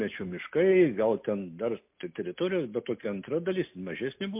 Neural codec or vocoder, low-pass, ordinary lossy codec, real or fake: none; 3.6 kHz; MP3, 32 kbps; real